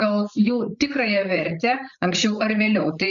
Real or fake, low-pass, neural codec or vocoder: fake; 7.2 kHz; codec, 16 kHz, 8 kbps, FreqCodec, larger model